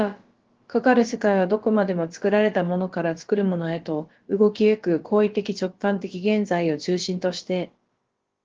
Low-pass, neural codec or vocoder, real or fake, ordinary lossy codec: 7.2 kHz; codec, 16 kHz, about 1 kbps, DyCAST, with the encoder's durations; fake; Opus, 16 kbps